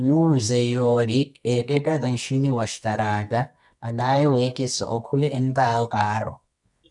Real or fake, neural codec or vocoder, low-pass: fake; codec, 24 kHz, 0.9 kbps, WavTokenizer, medium music audio release; 10.8 kHz